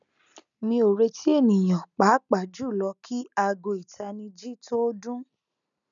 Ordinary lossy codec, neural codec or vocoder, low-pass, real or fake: none; none; 7.2 kHz; real